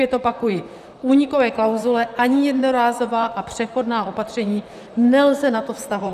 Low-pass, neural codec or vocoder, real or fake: 14.4 kHz; vocoder, 44.1 kHz, 128 mel bands, Pupu-Vocoder; fake